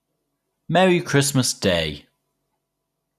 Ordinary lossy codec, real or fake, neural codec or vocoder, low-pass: none; real; none; 14.4 kHz